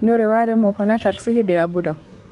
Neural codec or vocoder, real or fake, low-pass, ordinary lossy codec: codec, 24 kHz, 1 kbps, SNAC; fake; 10.8 kHz; none